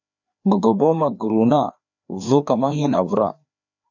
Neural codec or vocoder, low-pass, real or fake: codec, 16 kHz, 2 kbps, FreqCodec, larger model; 7.2 kHz; fake